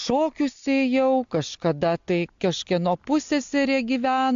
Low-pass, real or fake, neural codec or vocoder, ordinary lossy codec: 7.2 kHz; real; none; AAC, 64 kbps